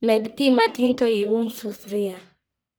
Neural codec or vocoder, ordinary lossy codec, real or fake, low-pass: codec, 44.1 kHz, 1.7 kbps, Pupu-Codec; none; fake; none